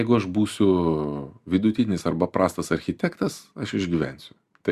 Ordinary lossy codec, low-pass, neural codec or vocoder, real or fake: Opus, 64 kbps; 14.4 kHz; none; real